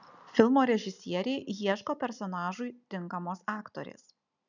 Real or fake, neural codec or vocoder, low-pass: real; none; 7.2 kHz